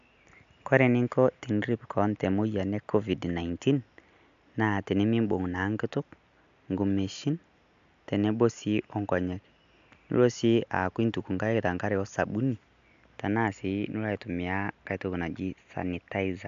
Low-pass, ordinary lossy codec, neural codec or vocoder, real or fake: 7.2 kHz; MP3, 64 kbps; none; real